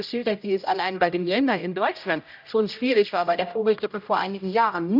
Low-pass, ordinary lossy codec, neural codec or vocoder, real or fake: 5.4 kHz; none; codec, 16 kHz, 0.5 kbps, X-Codec, HuBERT features, trained on general audio; fake